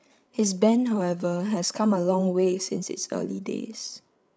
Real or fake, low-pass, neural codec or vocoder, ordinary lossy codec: fake; none; codec, 16 kHz, 8 kbps, FreqCodec, larger model; none